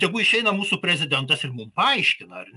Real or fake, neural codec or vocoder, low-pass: real; none; 10.8 kHz